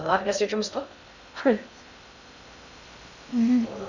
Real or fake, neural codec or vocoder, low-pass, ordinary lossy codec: fake; codec, 16 kHz in and 24 kHz out, 0.6 kbps, FocalCodec, streaming, 2048 codes; 7.2 kHz; none